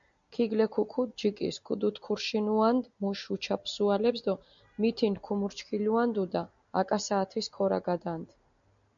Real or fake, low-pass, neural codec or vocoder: real; 7.2 kHz; none